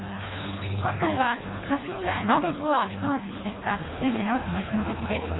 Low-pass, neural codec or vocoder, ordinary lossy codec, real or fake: 7.2 kHz; codec, 24 kHz, 1.5 kbps, HILCodec; AAC, 16 kbps; fake